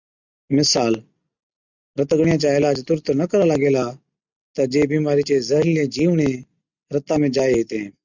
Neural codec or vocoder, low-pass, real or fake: none; 7.2 kHz; real